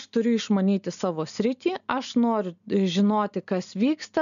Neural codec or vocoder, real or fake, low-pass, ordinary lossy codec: none; real; 7.2 kHz; MP3, 96 kbps